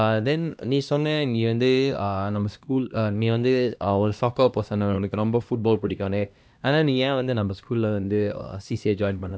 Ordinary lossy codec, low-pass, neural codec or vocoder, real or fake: none; none; codec, 16 kHz, 1 kbps, X-Codec, HuBERT features, trained on LibriSpeech; fake